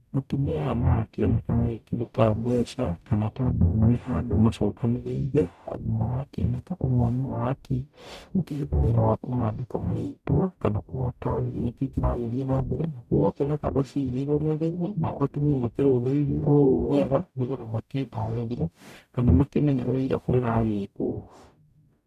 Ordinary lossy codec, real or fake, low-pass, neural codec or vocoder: none; fake; 14.4 kHz; codec, 44.1 kHz, 0.9 kbps, DAC